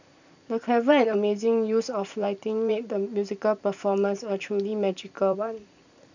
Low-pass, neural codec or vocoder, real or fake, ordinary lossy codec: 7.2 kHz; vocoder, 44.1 kHz, 128 mel bands every 512 samples, BigVGAN v2; fake; none